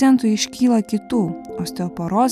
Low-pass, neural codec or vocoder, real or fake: 14.4 kHz; none; real